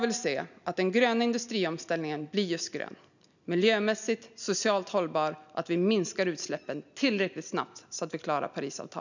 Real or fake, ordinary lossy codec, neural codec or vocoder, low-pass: real; none; none; 7.2 kHz